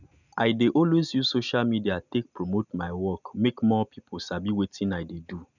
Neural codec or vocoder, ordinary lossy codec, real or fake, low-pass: none; none; real; 7.2 kHz